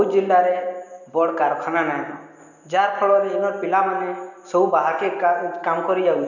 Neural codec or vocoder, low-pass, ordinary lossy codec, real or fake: none; 7.2 kHz; none; real